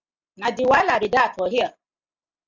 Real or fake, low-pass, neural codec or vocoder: real; 7.2 kHz; none